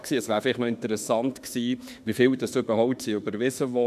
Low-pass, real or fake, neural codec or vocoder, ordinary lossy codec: 14.4 kHz; fake; autoencoder, 48 kHz, 32 numbers a frame, DAC-VAE, trained on Japanese speech; none